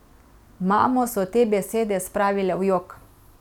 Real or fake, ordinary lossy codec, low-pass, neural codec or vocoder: real; none; 19.8 kHz; none